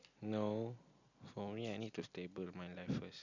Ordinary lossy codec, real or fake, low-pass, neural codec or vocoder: none; real; 7.2 kHz; none